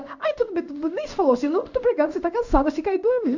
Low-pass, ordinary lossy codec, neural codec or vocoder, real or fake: 7.2 kHz; none; codec, 16 kHz in and 24 kHz out, 1 kbps, XY-Tokenizer; fake